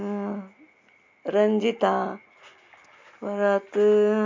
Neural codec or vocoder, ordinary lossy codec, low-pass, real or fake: none; MP3, 48 kbps; 7.2 kHz; real